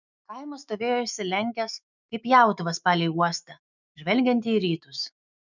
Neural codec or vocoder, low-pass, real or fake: autoencoder, 48 kHz, 128 numbers a frame, DAC-VAE, trained on Japanese speech; 7.2 kHz; fake